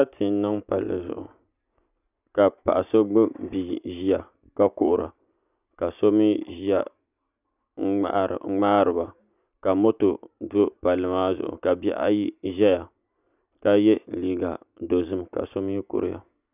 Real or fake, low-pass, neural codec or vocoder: real; 3.6 kHz; none